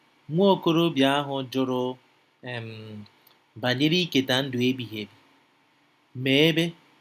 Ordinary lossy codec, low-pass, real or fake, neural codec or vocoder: none; 14.4 kHz; real; none